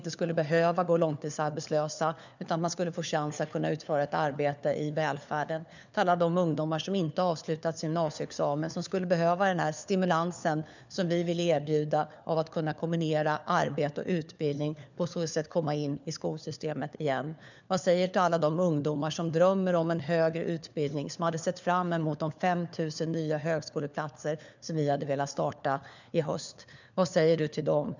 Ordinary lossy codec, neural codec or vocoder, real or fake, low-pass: none; codec, 16 kHz, 4 kbps, FunCodec, trained on LibriTTS, 50 frames a second; fake; 7.2 kHz